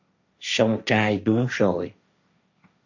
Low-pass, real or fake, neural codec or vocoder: 7.2 kHz; fake; codec, 16 kHz, 1.1 kbps, Voila-Tokenizer